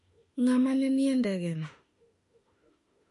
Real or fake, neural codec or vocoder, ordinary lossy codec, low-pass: fake; autoencoder, 48 kHz, 32 numbers a frame, DAC-VAE, trained on Japanese speech; MP3, 48 kbps; 14.4 kHz